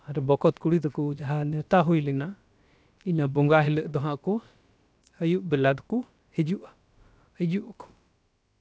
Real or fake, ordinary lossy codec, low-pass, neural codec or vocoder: fake; none; none; codec, 16 kHz, about 1 kbps, DyCAST, with the encoder's durations